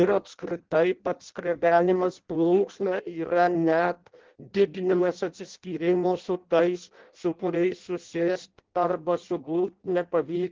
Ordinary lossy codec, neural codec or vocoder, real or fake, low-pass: Opus, 16 kbps; codec, 16 kHz in and 24 kHz out, 0.6 kbps, FireRedTTS-2 codec; fake; 7.2 kHz